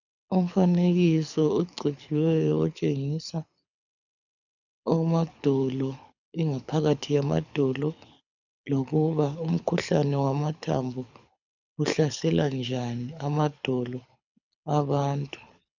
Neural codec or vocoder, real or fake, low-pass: codec, 24 kHz, 6 kbps, HILCodec; fake; 7.2 kHz